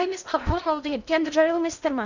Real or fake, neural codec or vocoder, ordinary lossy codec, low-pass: fake; codec, 16 kHz in and 24 kHz out, 0.6 kbps, FocalCodec, streaming, 2048 codes; none; 7.2 kHz